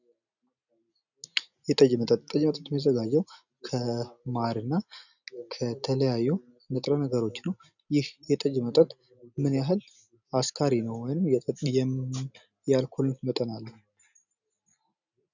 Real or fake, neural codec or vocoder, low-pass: real; none; 7.2 kHz